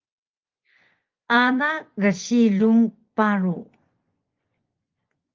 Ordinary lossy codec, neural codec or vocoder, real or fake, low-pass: Opus, 24 kbps; vocoder, 24 kHz, 100 mel bands, Vocos; fake; 7.2 kHz